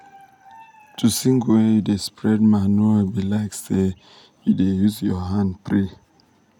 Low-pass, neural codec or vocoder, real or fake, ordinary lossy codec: 19.8 kHz; none; real; none